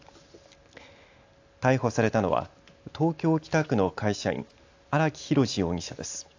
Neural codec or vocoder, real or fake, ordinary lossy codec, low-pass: none; real; AAC, 48 kbps; 7.2 kHz